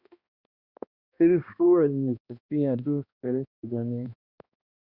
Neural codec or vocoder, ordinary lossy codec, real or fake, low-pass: codec, 16 kHz, 1 kbps, X-Codec, HuBERT features, trained on balanced general audio; Opus, 64 kbps; fake; 5.4 kHz